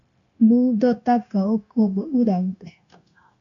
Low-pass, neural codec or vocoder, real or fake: 7.2 kHz; codec, 16 kHz, 0.9 kbps, LongCat-Audio-Codec; fake